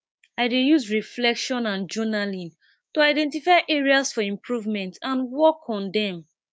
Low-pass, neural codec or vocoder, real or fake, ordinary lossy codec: none; codec, 16 kHz, 6 kbps, DAC; fake; none